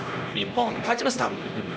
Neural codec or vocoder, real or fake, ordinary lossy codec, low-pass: codec, 16 kHz, 1 kbps, X-Codec, HuBERT features, trained on LibriSpeech; fake; none; none